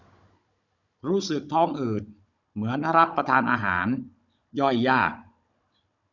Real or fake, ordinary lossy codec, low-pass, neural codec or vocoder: fake; none; 7.2 kHz; codec, 16 kHz, 16 kbps, FunCodec, trained on Chinese and English, 50 frames a second